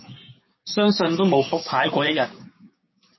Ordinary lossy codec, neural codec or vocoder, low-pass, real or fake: MP3, 24 kbps; codec, 16 kHz, 6 kbps, DAC; 7.2 kHz; fake